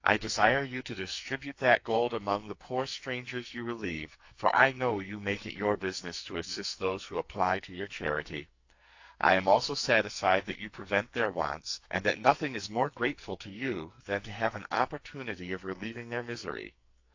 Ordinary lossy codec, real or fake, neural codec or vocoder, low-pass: AAC, 48 kbps; fake; codec, 44.1 kHz, 2.6 kbps, SNAC; 7.2 kHz